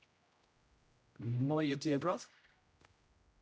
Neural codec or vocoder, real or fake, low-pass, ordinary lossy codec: codec, 16 kHz, 0.5 kbps, X-Codec, HuBERT features, trained on general audio; fake; none; none